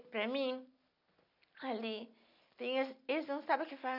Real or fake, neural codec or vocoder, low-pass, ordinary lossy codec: real; none; 5.4 kHz; none